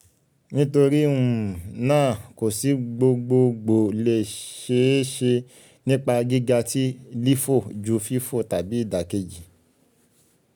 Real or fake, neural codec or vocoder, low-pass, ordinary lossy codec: real; none; none; none